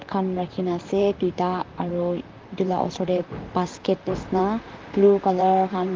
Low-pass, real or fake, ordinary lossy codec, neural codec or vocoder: 7.2 kHz; fake; Opus, 24 kbps; vocoder, 44.1 kHz, 128 mel bands, Pupu-Vocoder